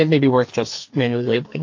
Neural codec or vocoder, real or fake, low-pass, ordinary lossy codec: codec, 44.1 kHz, 2.6 kbps, SNAC; fake; 7.2 kHz; AAC, 48 kbps